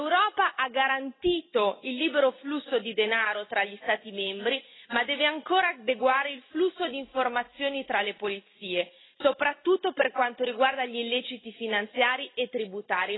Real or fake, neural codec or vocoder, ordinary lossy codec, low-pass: real; none; AAC, 16 kbps; 7.2 kHz